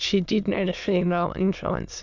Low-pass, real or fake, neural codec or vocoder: 7.2 kHz; fake; autoencoder, 22.05 kHz, a latent of 192 numbers a frame, VITS, trained on many speakers